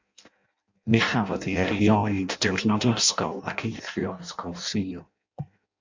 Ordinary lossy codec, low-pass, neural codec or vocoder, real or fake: MP3, 64 kbps; 7.2 kHz; codec, 16 kHz in and 24 kHz out, 0.6 kbps, FireRedTTS-2 codec; fake